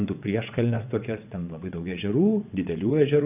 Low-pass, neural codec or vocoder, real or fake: 3.6 kHz; vocoder, 22.05 kHz, 80 mel bands, WaveNeXt; fake